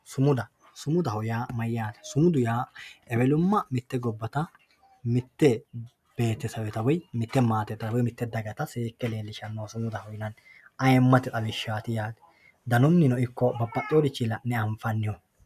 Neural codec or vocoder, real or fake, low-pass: none; real; 14.4 kHz